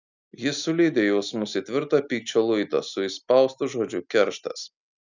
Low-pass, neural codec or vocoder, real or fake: 7.2 kHz; none; real